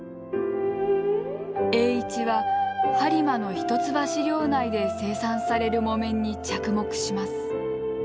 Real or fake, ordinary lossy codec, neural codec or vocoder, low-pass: real; none; none; none